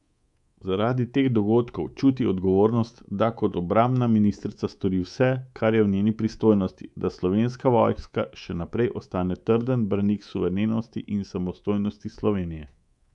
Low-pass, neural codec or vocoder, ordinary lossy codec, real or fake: 10.8 kHz; codec, 24 kHz, 3.1 kbps, DualCodec; none; fake